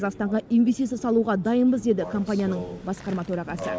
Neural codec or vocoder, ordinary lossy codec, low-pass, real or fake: none; none; none; real